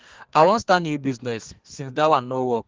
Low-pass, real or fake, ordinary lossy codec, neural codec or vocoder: 7.2 kHz; fake; Opus, 16 kbps; codec, 32 kHz, 1.9 kbps, SNAC